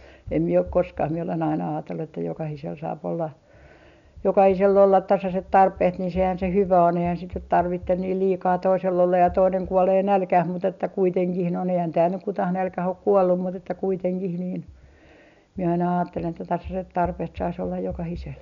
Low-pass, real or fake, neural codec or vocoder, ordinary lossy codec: 7.2 kHz; real; none; none